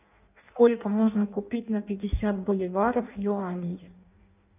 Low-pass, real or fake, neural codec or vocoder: 3.6 kHz; fake; codec, 16 kHz in and 24 kHz out, 0.6 kbps, FireRedTTS-2 codec